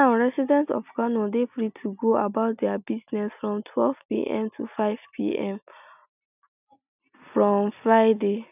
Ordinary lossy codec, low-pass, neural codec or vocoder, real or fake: none; 3.6 kHz; none; real